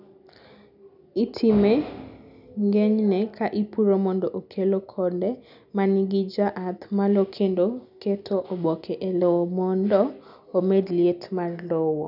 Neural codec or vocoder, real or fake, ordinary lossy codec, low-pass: none; real; none; 5.4 kHz